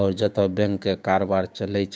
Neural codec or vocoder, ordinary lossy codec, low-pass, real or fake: codec, 16 kHz, 6 kbps, DAC; none; none; fake